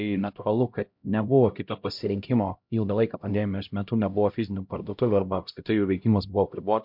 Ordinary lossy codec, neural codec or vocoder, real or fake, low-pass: MP3, 48 kbps; codec, 16 kHz, 0.5 kbps, X-Codec, HuBERT features, trained on LibriSpeech; fake; 5.4 kHz